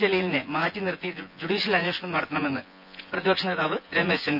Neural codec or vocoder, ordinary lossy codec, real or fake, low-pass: vocoder, 24 kHz, 100 mel bands, Vocos; none; fake; 5.4 kHz